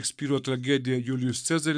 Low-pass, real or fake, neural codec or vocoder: 9.9 kHz; fake; codec, 44.1 kHz, 7.8 kbps, Pupu-Codec